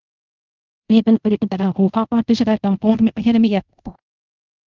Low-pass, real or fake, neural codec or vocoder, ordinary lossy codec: 7.2 kHz; fake; codec, 24 kHz, 0.5 kbps, DualCodec; Opus, 24 kbps